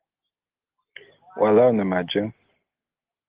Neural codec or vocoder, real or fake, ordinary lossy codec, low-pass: none; real; Opus, 16 kbps; 3.6 kHz